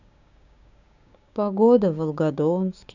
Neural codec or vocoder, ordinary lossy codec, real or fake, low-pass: codec, 16 kHz, 6 kbps, DAC; none; fake; 7.2 kHz